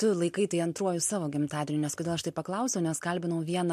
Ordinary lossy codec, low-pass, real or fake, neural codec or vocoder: MP3, 64 kbps; 14.4 kHz; real; none